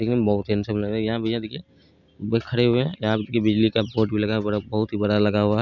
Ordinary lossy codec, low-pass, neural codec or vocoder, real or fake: none; 7.2 kHz; none; real